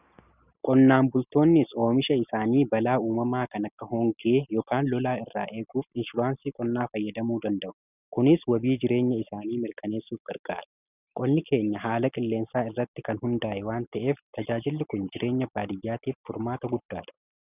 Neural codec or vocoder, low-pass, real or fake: none; 3.6 kHz; real